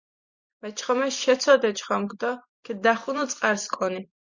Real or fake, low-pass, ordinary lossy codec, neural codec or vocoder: fake; 7.2 kHz; Opus, 64 kbps; vocoder, 44.1 kHz, 128 mel bands every 256 samples, BigVGAN v2